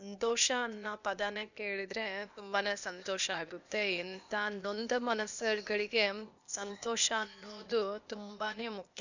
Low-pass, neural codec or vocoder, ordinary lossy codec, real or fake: 7.2 kHz; codec, 16 kHz, 0.8 kbps, ZipCodec; none; fake